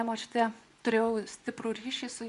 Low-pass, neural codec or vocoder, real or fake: 10.8 kHz; vocoder, 24 kHz, 100 mel bands, Vocos; fake